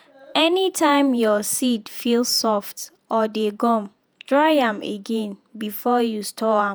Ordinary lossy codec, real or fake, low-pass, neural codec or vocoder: none; fake; none; vocoder, 48 kHz, 128 mel bands, Vocos